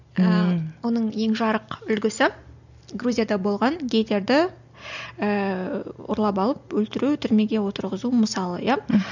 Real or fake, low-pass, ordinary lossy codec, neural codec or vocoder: real; 7.2 kHz; none; none